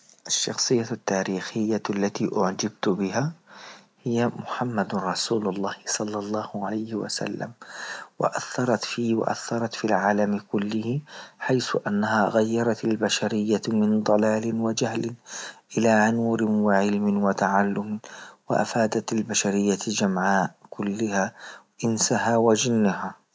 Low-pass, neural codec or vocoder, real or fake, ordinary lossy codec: none; none; real; none